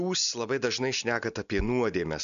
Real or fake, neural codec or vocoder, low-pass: real; none; 7.2 kHz